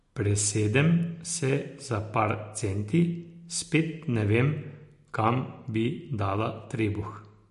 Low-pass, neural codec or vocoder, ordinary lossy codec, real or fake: 14.4 kHz; none; MP3, 48 kbps; real